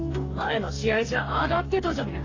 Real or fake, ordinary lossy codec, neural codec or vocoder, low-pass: fake; AAC, 32 kbps; codec, 44.1 kHz, 2.6 kbps, DAC; 7.2 kHz